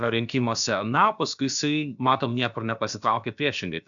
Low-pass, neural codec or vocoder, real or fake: 7.2 kHz; codec, 16 kHz, about 1 kbps, DyCAST, with the encoder's durations; fake